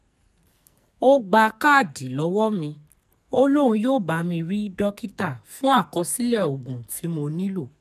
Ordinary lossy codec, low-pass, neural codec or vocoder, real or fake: none; 14.4 kHz; codec, 44.1 kHz, 2.6 kbps, SNAC; fake